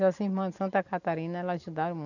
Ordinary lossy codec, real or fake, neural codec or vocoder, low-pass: none; real; none; 7.2 kHz